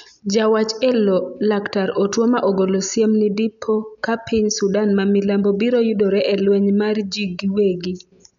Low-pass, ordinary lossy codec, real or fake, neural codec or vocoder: 7.2 kHz; none; real; none